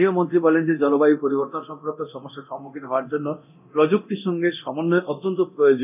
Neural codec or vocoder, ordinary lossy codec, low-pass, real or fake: codec, 24 kHz, 0.9 kbps, DualCodec; none; 3.6 kHz; fake